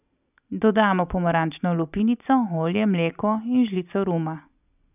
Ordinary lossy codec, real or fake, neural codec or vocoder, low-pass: none; real; none; 3.6 kHz